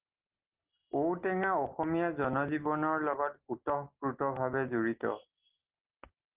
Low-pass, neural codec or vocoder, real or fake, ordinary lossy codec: 3.6 kHz; none; real; Opus, 16 kbps